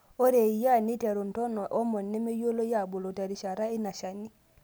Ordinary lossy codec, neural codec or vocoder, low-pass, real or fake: none; none; none; real